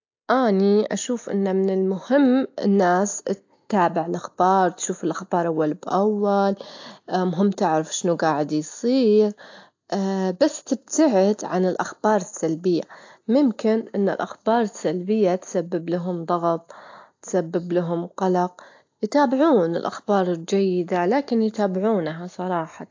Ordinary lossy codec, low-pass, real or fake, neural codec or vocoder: AAC, 48 kbps; 7.2 kHz; real; none